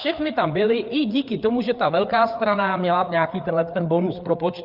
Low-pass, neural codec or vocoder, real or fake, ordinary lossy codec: 5.4 kHz; codec, 16 kHz, 4 kbps, FreqCodec, larger model; fake; Opus, 24 kbps